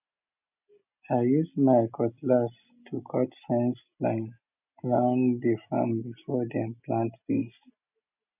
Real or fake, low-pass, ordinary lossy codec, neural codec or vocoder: real; 3.6 kHz; none; none